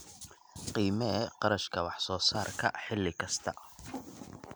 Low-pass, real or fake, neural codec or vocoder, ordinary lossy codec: none; real; none; none